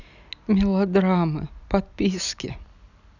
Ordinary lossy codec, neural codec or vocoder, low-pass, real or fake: none; none; 7.2 kHz; real